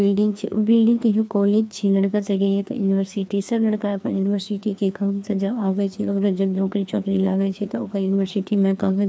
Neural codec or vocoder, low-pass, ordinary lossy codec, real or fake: codec, 16 kHz, 2 kbps, FreqCodec, larger model; none; none; fake